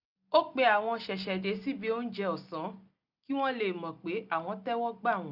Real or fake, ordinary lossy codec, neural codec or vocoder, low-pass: real; none; none; 5.4 kHz